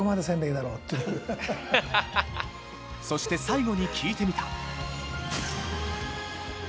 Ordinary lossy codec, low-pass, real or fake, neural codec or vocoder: none; none; real; none